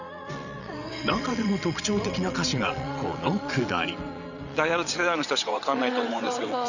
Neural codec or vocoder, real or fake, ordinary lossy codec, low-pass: vocoder, 22.05 kHz, 80 mel bands, WaveNeXt; fake; none; 7.2 kHz